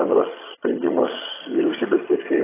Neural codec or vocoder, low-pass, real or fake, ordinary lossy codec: vocoder, 22.05 kHz, 80 mel bands, HiFi-GAN; 3.6 kHz; fake; AAC, 16 kbps